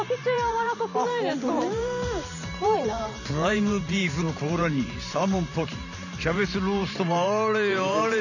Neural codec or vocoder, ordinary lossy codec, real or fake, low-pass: vocoder, 44.1 kHz, 128 mel bands every 512 samples, BigVGAN v2; none; fake; 7.2 kHz